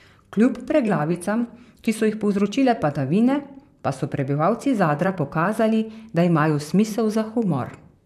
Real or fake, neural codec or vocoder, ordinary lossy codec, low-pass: fake; vocoder, 44.1 kHz, 128 mel bands, Pupu-Vocoder; none; 14.4 kHz